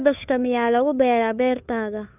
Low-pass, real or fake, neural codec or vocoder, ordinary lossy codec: 3.6 kHz; fake; codec, 16 kHz, 2 kbps, FunCodec, trained on Chinese and English, 25 frames a second; none